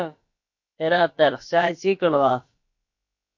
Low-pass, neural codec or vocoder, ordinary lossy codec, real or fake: 7.2 kHz; codec, 16 kHz, about 1 kbps, DyCAST, with the encoder's durations; MP3, 48 kbps; fake